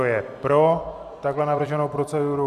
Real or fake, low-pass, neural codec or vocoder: fake; 14.4 kHz; vocoder, 44.1 kHz, 128 mel bands every 256 samples, BigVGAN v2